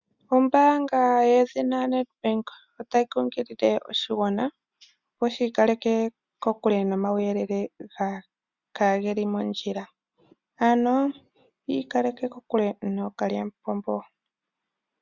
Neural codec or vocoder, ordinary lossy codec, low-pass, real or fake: none; Opus, 64 kbps; 7.2 kHz; real